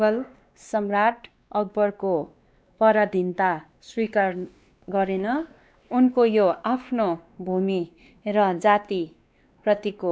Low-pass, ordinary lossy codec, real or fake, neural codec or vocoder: none; none; fake; codec, 16 kHz, 2 kbps, X-Codec, WavLM features, trained on Multilingual LibriSpeech